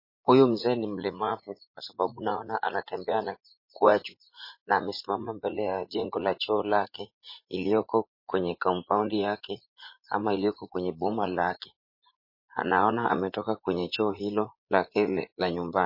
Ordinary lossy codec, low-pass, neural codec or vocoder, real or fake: MP3, 24 kbps; 5.4 kHz; vocoder, 44.1 kHz, 80 mel bands, Vocos; fake